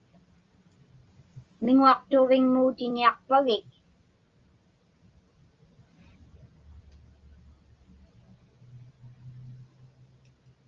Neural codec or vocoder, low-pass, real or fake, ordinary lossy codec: none; 7.2 kHz; real; Opus, 32 kbps